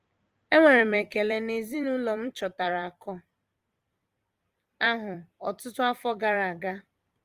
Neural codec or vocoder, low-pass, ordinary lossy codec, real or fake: vocoder, 44.1 kHz, 128 mel bands every 256 samples, BigVGAN v2; 14.4 kHz; Opus, 24 kbps; fake